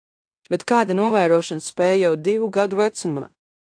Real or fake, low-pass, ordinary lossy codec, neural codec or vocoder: fake; 9.9 kHz; AAC, 64 kbps; codec, 16 kHz in and 24 kHz out, 0.9 kbps, LongCat-Audio-Codec, fine tuned four codebook decoder